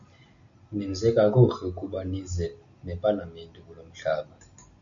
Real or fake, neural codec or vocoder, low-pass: real; none; 7.2 kHz